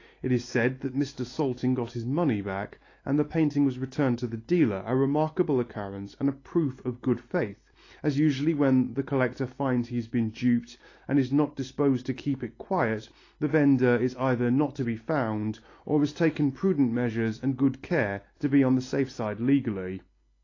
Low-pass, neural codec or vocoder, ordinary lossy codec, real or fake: 7.2 kHz; none; AAC, 32 kbps; real